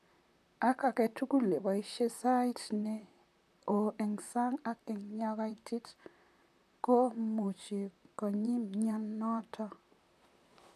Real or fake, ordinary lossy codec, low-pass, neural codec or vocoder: fake; none; 14.4 kHz; vocoder, 44.1 kHz, 128 mel bands, Pupu-Vocoder